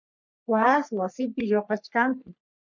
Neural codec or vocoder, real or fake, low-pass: codec, 44.1 kHz, 3.4 kbps, Pupu-Codec; fake; 7.2 kHz